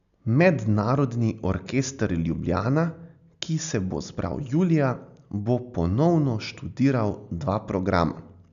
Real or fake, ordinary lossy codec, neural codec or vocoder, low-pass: real; none; none; 7.2 kHz